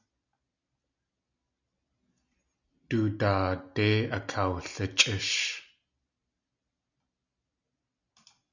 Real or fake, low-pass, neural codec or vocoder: real; 7.2 kHz; none